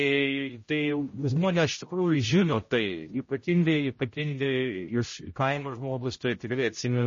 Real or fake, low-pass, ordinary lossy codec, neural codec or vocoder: fake; 7.2 kHz; MP3, 32 kbps; codec, 16 kHz, 0.5 kbps, X-Codec, HuBERT features, trained on general audio